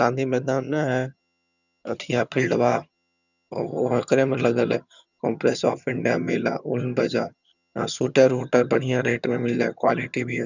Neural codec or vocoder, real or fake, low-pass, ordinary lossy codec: vocoder, 22.05 kHz, 80 mel bands, HiFi-GAN; fake; 7.2 kHz; none